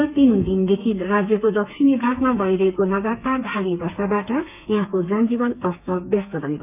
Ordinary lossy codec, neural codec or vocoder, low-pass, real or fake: none; codec, 32 kHz, 1.9 kbps, SNAC; 3.6 kHz; fake